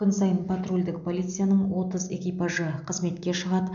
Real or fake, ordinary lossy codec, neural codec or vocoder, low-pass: real; none; none; 7.2 kHz